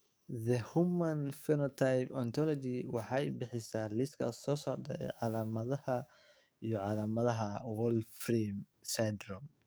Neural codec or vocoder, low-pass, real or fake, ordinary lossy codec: codec, 44.1 kHz, 7.8 kbps, DAC; none; fake; none